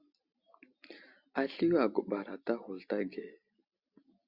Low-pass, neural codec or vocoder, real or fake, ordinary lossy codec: 5.4 kHz; none; real; Opus, 64 kbps